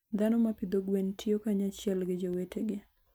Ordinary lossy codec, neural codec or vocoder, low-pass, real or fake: none; none; none; real